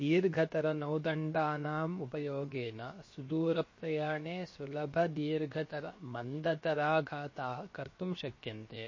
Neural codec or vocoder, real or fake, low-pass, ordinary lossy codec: codec, 16 kHz, 0.7 kbps, FocalCodec; fake; 7.2 kHz; MP3, 32 kbps